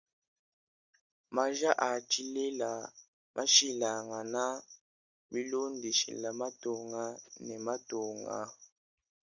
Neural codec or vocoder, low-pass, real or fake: none; 7.2 kHz; real